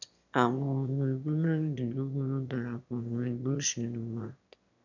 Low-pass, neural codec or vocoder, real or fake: 7.2 kHz; autoencoder, 22.05 kHz, a latent of 192 numbers a frame, VITS, trained on one speaker; fake